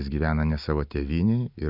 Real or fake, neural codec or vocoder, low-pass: real; none; 5.4 kHz